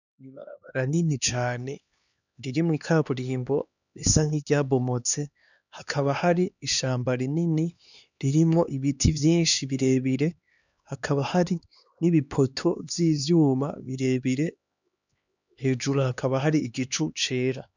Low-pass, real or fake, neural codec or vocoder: 7.2 kHz; fake; codec, 16 kHz, 2 kbps, X-Codec, HuBERT features, trained on LibriSpeech